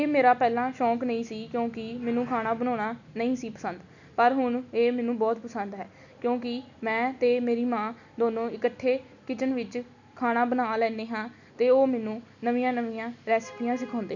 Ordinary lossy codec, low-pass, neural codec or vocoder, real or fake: none; 7.2 kHz; none; real